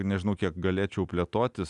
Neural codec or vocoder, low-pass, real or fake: none; 10.8 kHz; real